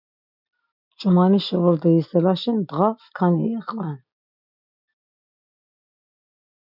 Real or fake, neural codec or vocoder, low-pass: real; none; 5.4 kHz